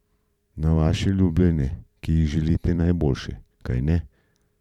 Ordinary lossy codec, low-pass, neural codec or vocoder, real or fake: none; 19.8 kHz; vocoder, 44.1 kHz, 128 mel bands every 512 samples, BigVGAN v2; fake